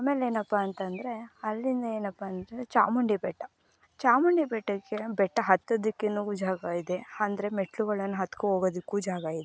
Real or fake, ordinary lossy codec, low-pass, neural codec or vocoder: real; none; none; none